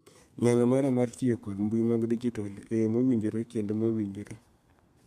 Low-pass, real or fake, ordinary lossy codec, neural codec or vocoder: 14.4 kHz; fake; MP3, 96 kbps; codec, 32 kHz, 1.9 kbps, SNAC